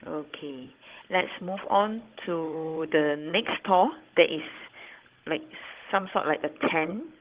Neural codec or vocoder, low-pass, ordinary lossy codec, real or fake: codec, 16 kHz, 16 kbps, FunCodec, trained on Chinese and English, 50 frames a second; 3.6 kHz; Opus, 16 kbps; fake